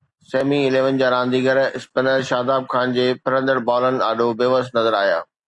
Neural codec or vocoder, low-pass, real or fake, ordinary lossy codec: none; 10.8 kHz; real; MP3, 64 kbps